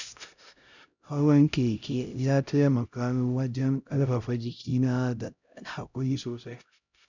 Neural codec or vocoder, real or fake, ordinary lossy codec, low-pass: codec, 16 kHz, 0.5 kbps, X-Codec, HuBERT features, trained on LibriSpeech; fake; none; 7.2 kHz